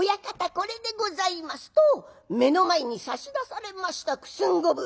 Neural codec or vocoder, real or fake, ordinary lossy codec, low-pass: none; real; none; none